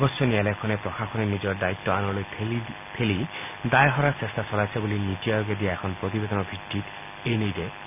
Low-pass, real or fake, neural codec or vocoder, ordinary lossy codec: 3.6 kHz; real; none; none